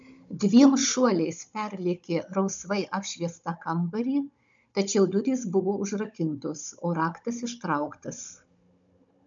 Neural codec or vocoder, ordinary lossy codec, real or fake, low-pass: codec, 16 kHz, 16 kbps, FunCodec, trained on Chinese and English, 50 frames a second; MP3, 64 kbps; fake; 7.2 kHz